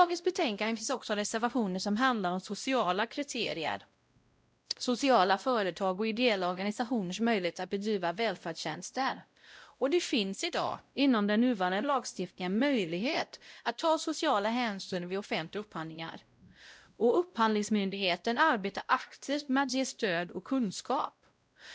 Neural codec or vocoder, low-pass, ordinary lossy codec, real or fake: codec, 16 kHz, 0.5 kbps, X-Codec, WavLM features, trained on Multilingual LibriSpeech; none; none; fake